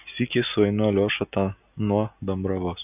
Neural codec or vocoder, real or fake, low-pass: none; real; 3.6 kHz